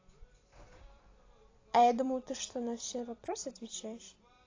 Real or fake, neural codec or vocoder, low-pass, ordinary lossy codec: fake; vocoder, 44.1 kHz, 128 mel bands every 256 samples, BigVGAN v2; 7.2 kHz; AAC, 32 kbps